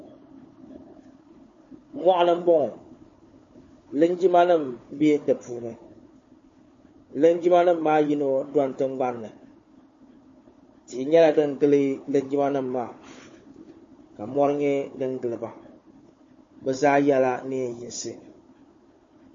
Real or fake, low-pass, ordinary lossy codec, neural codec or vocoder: fake; 7.2 kHz; MP3, 32 kbps; codec, 16 kHz, 4 kbps, FunCodec, trained on Chinese and English, 50 frames a second